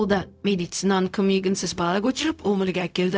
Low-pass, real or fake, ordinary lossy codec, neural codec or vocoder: none; fake; none; codec, 16 kHz, 0.4 kbps, LongCat-Audio-Codec